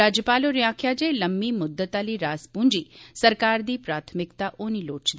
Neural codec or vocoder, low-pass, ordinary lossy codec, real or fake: none; none; none; real